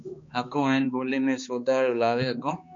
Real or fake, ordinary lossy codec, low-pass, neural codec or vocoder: fake; MP3, 48 kbps; 7.2 kHz; codec, 16 kHz, 2 kbps, X-Codec, HuBERT features, trained on balanced general audio